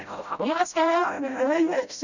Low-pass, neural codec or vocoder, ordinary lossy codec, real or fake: 7.2 kHz; codec, 16 kHz, 0.5 kbps, FreqCodec, smaller model; none; fake